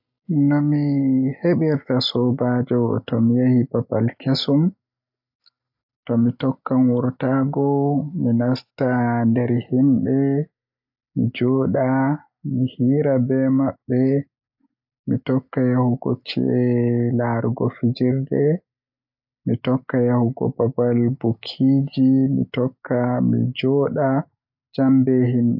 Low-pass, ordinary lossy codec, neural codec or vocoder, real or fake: 5.4 kHz; AAC, 48 kbps; none; real